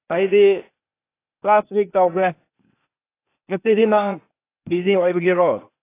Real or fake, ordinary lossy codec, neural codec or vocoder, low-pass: fake; AAC, 24 kbps; codec, 16 kHz, 0.8 kbps, ZipCodec; 3.6 kHz